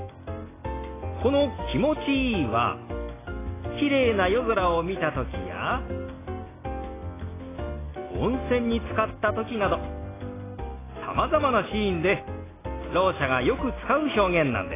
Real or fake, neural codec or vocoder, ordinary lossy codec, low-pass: real; none; AAC, 16 kbps; 3.6 kHz